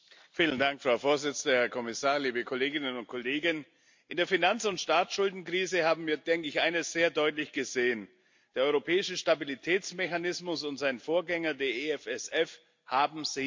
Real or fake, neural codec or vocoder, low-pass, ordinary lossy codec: real; none; 7.2 kHz; MP3, 48 kbps